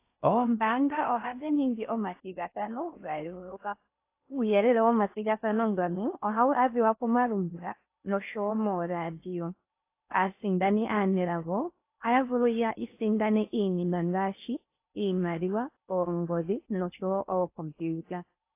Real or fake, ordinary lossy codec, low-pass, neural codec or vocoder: fake; AAC, 24 kbps; 3.6 kHz; codec, 16 kHz in and 24 kHz out, 0.6 kbps, FocalCodec, streaming, 4096 codes